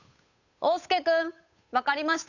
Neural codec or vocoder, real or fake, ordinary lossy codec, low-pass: codec, 16 kHz, 8 kbps, FunCodec, trained on Chinese and English, 25 frames a second; fake; none; 7.2 kHz